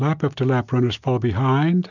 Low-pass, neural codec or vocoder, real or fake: 7.2 kHz; none; real